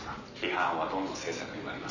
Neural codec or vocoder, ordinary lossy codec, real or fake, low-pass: none; none; real; 7.2 kHz